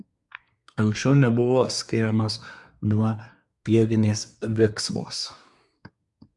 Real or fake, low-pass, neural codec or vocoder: fake; 10.8 kHz; codec, 24 kHz, 1 kbps, SNAC